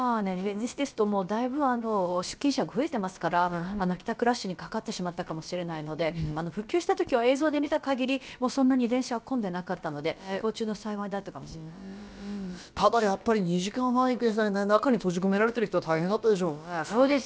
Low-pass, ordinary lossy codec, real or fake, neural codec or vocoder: none; none; fake; codec, 16 kHz, about 1 kbps, DyCAST, with the encoder's durations